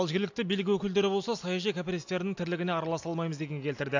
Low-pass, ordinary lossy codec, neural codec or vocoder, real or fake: 7.2 kHz; AAC, 48 kbps; none; real